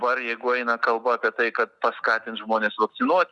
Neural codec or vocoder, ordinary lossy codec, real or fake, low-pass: none; Opus, 64 kbps; real; 10.8 kHz